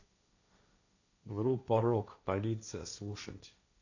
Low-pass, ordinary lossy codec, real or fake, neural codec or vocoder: 7.2 kHz; none; fake; codec, 16 kHz, 1.1 kbps, Voila-Tokenizer